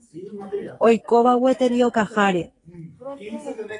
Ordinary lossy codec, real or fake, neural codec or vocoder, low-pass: AAC, 32 kbps; fake; codec, 44.1 kHz, 2.6 kbps, SNAC; 10.8 kHz